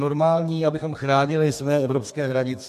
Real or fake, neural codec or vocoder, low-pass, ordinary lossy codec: fake; codec, 32 kHz, 1.9 kbps, SNAC; 14.4 kHz; MP3, 64 kbps